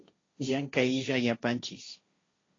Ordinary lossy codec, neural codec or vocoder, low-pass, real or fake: AAC, 32 kbps; codec, 16 kHz, 1.1 kbps, Voila-Tokenizer; 7.2 kHz; fake